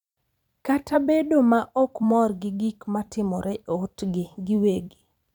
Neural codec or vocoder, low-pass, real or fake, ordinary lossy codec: vocoder, 44.1 kHz, 128 mel bands every 256 samples, BigVGAN v2; 19.8 kHz; fake; none